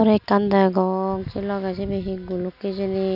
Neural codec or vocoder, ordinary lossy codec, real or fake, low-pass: none; none; real; 5.4 kHz